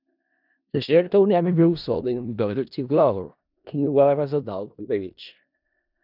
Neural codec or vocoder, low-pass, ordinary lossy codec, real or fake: codec, 16 kHz in and 24 kHz out, 0.4 kbps, LongCat-Audio-Codec, four codebook decoder; 5.4 kHz; none; fake